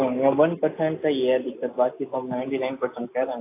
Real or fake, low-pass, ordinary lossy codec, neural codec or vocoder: real; 3.6 kHz; AAC, 24 kbps; none